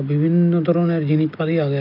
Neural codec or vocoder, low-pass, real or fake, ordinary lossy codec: none; 5.4 kHz; real; none